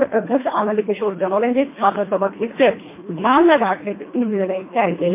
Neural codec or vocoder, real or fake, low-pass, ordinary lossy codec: codec, 24 kHz, 1.5 kbps, HILCodec; fake; 3.6 kHz; MP3, 24 kbps